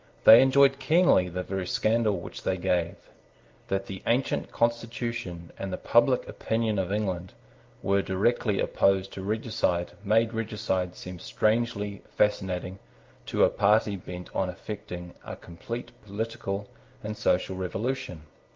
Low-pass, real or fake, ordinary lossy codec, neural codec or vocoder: 7.2 kHz; real; Opus, 32 kbps; none